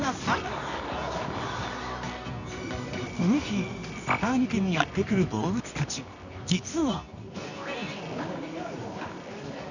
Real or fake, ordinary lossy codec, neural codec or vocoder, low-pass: fake; none; codec, 24 kHz, 0.9 kbps, WavTokenizer, medium music audio release; 7.2 kHz